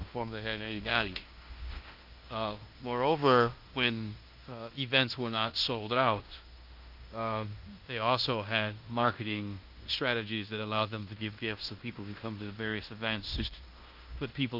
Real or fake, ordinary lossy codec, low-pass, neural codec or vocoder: fake; Opus, 32 kbps; 5.4 kHz; codec, 16 kHz in and 24 kHz out, 0.9 kbps, LongCat-Audio-Codec, fine tuned four codebook decoder